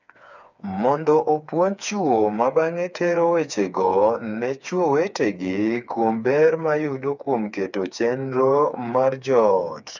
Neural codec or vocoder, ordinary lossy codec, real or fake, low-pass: codec, 16 kHz, 4 kbps, FreqCodec, smaller model; none; fake; 7.2 kHz